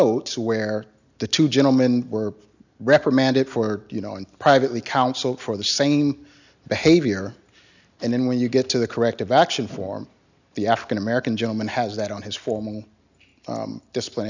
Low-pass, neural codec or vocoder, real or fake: 7.2 kHz; none; real